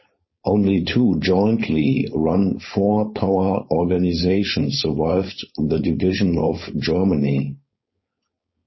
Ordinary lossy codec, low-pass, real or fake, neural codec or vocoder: MP3, 24 kbps; 7.2 kHz; fake; codec, 16 kHz, 4.8 kbps, FACodec